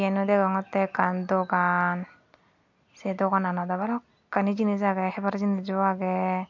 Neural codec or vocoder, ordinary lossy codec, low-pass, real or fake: none; MP3, 48 kbps; 7.2 kHz; real